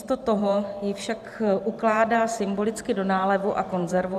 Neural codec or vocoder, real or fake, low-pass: vocoder, 44.1 kHz, 128 mel bands every 512 samples, BigVGAN v2; fake; 14.4 kHz